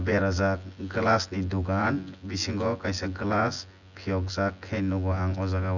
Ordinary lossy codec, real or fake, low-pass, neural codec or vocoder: none; fake; 7.2 kHz; vocoder, 24 kHz, 100 mel bands, Vocos